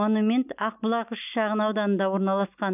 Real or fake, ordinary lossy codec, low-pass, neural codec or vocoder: real; none; 3.6 kHz; none